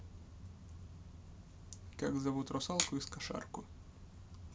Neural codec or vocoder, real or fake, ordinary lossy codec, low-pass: none; real; none; none